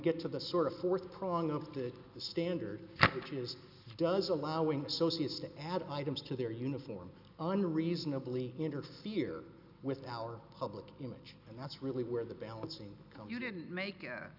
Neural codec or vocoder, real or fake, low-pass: none; real; 5.4 kHz